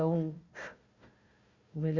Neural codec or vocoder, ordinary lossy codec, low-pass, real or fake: codec, 16 kHz, 1 kbps, FunCodec, trained on Chinese and English, 50 frames a second; Opus, 64 kbps; 7.2 kHz; fake